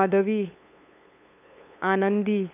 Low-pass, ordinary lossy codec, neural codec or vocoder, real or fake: 3.6 kHz; none; codec, 16 kHz, 8 kbps, FunCodec, trained on LibriTTS, 25 frames a second; fake